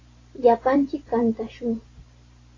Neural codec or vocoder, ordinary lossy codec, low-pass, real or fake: vocoder, 44.1 kHz, 128 mel bands every 512 samples, BigVGAN v2; AAC, 32 kbps; 7.2 kHz; fake